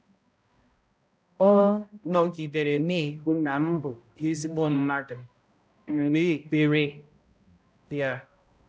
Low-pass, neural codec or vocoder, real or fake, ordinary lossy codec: none; codec, 16 kHz, 0.5 kbps, X-Codec, HuBERT features, trained on balanced general audio; fake; none